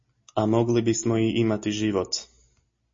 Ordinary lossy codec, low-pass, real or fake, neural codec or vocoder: MP3, 32 kbps; 7.2 kHz; real; none